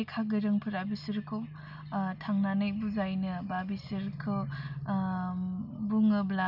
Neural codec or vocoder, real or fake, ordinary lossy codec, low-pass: none; real; none; 5.4 kHz